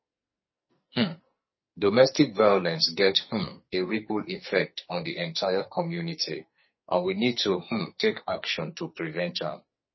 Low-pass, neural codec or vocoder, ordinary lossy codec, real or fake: 7.2 kHz; codec, 44.1 kHz, 2.6 kbps, SNAC; MP3, 24 kbps; fake